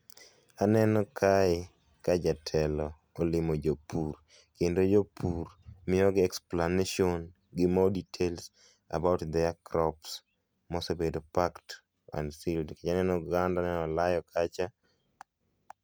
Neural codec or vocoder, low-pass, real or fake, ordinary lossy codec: none; none; real; none